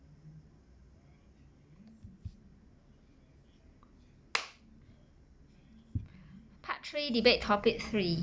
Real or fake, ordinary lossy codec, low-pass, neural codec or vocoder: real; none; none; none